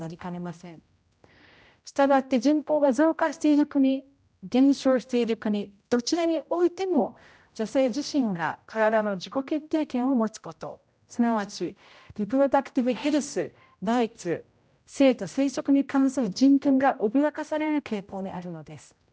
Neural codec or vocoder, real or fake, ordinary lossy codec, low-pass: codec, 16 kHz, 0.5 kbps, X-Codec, HuBERT features, trained on general audio; fake; none; none